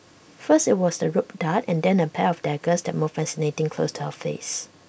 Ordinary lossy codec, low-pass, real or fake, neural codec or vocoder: none; none; real; none